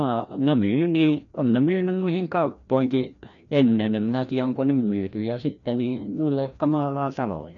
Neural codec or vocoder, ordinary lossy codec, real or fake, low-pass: codec, 16 kHz, 1 kbps, FreqCodec, larger model; none; fake; 7.2 kHz